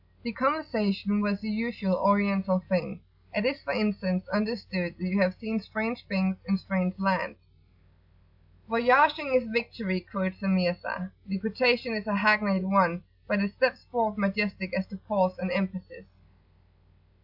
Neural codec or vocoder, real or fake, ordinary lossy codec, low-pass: none; real; AAC, 48 kbps; 5.4 kHz